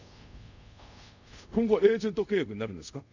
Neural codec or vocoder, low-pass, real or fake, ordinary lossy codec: codec, 24 kHz, 0.5 kbps, DualCodec; 7.2 kHz; fake; none